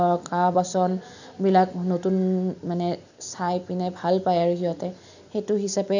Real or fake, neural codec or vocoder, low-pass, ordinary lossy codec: real; none; 7.2 kHz; none